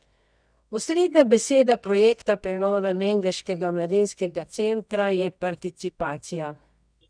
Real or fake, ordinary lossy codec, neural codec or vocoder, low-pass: fake; none; codec, 24 kHz, 0.9 kbps, WavTokenizer, medium music audio release; 9.9 kHz